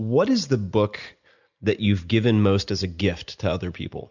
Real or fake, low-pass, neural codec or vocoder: real; 7.2 kHz; none